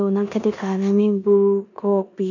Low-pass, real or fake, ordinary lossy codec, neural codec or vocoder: 7.2 kHz; fake; none; codec, 16 kHz in and 24 kHz out, 0.9 kbps, LongCat-Audio-Codec, four codebook decoder